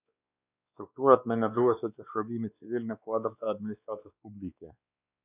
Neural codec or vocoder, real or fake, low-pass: codec, 16 kHz, 2 kbps, X-Codec, WavLM features, trained on Multilingual LibriSpeech; fake; 3.6 kHz